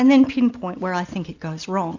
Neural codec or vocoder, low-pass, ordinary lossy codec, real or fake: none; 7.2 kHz; Opus, 64 kbps; real